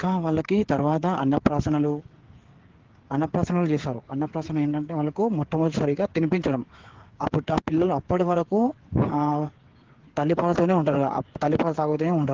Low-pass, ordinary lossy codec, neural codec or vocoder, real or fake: 7.2 kHz; Opus, 16 kbps; codec, 16 kHz, 8 kbps, FreqCodec, smaller model; fake